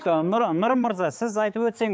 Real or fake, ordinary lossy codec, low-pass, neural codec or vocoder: fake; none; none; codec, 16 kHz, 4 kbps, X-Codec, HuBERT features, trained on balanced general audio